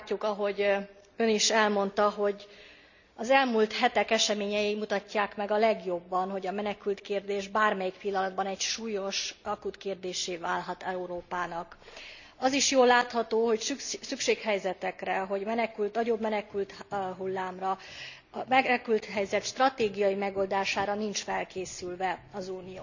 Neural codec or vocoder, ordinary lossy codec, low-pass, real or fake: none; none; 7.2 kHz; real